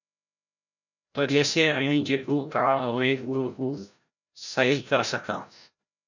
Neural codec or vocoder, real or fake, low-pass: codec, 16 kHz, 0.5 kbps, FreqCodec, larger model; fake; 7.2 kHz